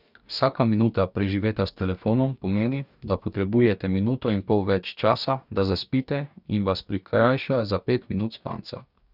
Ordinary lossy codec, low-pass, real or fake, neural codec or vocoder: none; 5.4 kHz; fake; codec, 44.1 kHz, 2.6 kbps, DAC